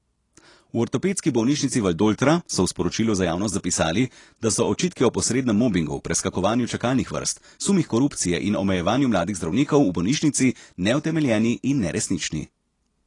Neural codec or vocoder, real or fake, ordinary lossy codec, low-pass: none; real; AAC, 32 kbps; 10.8 kHz